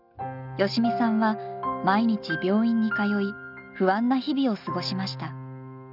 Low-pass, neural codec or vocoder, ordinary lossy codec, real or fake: 5.4 kHz; none; none; real